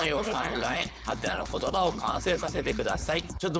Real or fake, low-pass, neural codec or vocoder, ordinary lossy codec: fake; none; codec, 16 kHz, 4.8 kbps, FACodec; none